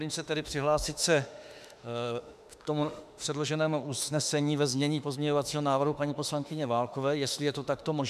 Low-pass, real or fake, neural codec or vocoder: 14.4 kHz; fake; autoencoder, 48 kHz, 32 numbers a frame, DAC-VAE, trained on Japanese speech